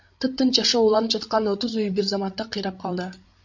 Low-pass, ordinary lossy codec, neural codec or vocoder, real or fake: 7.2 kHz; MP3, 48 kbps; codec, 16 kHz, 16 kbps, FreqCodec, larger model; fake